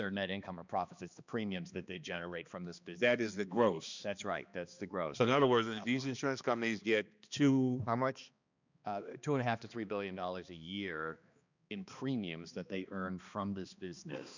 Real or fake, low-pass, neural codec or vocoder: fake; 7.2 kHz; codec, 16 kHz, 2 kbps, X-Codec, HuBERT features, trained on balanced general audio